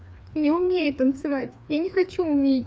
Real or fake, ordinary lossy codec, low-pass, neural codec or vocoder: fake; none; none; codec, 16 kHz, 2 kbps, FreqCodec, larger model